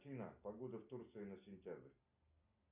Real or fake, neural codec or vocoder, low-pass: real; none; 3.6 kHz